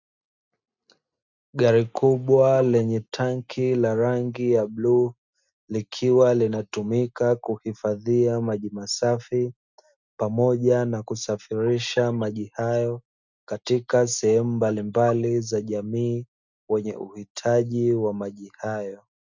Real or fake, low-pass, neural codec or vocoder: real; 7.2 kHz; none